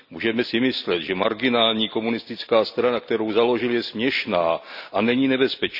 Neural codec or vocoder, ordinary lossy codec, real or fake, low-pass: none; none; real; 5.4 kHz